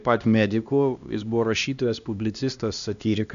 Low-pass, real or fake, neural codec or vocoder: 7.2 kHz; fake; codec, 16 kHz, 1 kbps, X-Codec, HuBERT features, trained on LibriSpeech